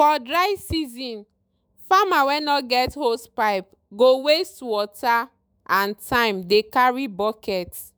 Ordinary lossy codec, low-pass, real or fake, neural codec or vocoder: none; none; fake; autoencoder, 48 kHz, 128 numbers a frame, DAC-VAE, trained on Japanese speech